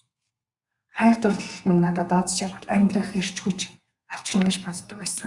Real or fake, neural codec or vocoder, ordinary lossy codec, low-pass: fake; codec, 32 kHz, 1.9 kbps, SNAC; Opus, 64 kbps; 10.8 kHz